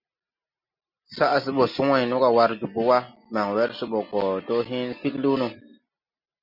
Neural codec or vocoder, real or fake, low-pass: none; real; 5.4 kHz